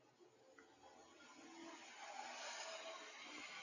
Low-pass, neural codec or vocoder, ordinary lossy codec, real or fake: 7.2 kHz; none; Opus, 64 kbps; real